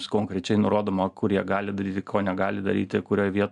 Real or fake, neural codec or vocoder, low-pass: real; none; 10.8 kHz